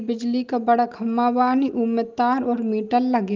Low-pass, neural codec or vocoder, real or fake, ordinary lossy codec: 7.2 kHz; none; real; Opus, 32 kbps